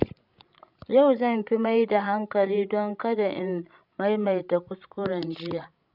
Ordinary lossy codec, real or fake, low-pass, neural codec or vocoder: none; fake; 5.4 kHz; codec, 16 kHz, 16 kbps, FreqCodec, larger model